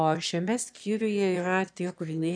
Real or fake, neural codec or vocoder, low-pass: fake; autoencoder, 22.05 kHz, a latent of 192 numbers a frame, VITS, trained on one speaker; 9.9 kHz